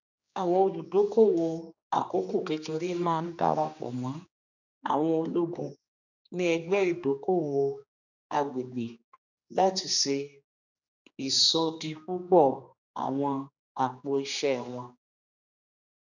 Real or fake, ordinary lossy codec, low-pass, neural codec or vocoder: fake; none; 7.2 kHz; codec, 16 kHz, 2 kbps, X-Codec, HuBERT features, trained on general audio